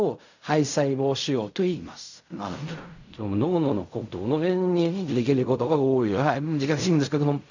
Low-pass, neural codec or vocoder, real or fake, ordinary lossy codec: 7.2 kHz; codec, 16 kHz in and 24 kHz out, 0.4 kbps, LongCat-Audio-Codec, fine tuned four codebook decoder; fake; none